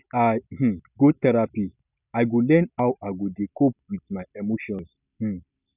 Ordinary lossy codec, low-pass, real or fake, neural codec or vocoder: none; 3.6 kHz; real; none